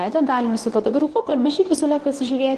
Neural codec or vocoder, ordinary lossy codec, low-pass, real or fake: codec, 24 kHz, 0.9 kbps, WavTokenizer, medium speech release version 1; Opus, 16 kbps; 10.8 kHz; fake